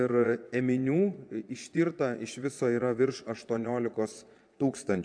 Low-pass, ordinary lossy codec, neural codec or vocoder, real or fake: 9.9 kHz; AAC, 64 kbps; vocoder, 22.05 kHz, 80 mel bands, Vocos; fake